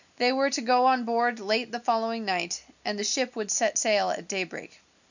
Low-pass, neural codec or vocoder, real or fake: 7.2 kHz; none; real